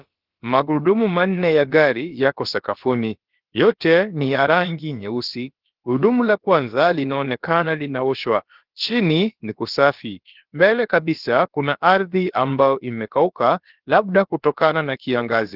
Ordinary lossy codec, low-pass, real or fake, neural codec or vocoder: Opus, 16 kbps; 5.4 kHz; fake; codec, 16 kHz, about 1 kbps, DyCAST, with the encoder's durations